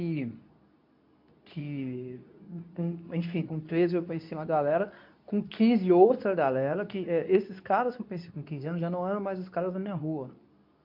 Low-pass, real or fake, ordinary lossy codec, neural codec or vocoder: 5.4 kHz; fake; MP3, 48 kbps; codec, 24 kHz, 0.9 kbps, WavTokenizer, medium speech release version 2